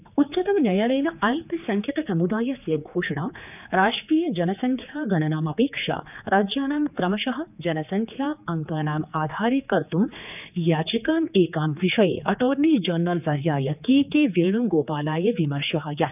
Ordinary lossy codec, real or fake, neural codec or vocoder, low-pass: none; fake; codec, 16 kHz, 4 kbps, X-Codec, HuBERT features, trained on general audio; 3.6 kHz